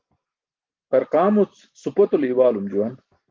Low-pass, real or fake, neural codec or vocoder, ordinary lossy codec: 7.2 kHz; real; none; Opus, 32 kbps